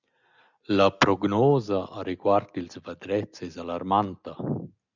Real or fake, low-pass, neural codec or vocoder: real; 7.2 kHz; none